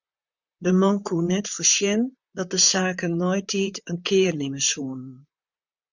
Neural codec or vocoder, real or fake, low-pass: vocoder, 44.1 kHz, 128 mel bands, Pupu-Vocoder; fake; 7.2 kHz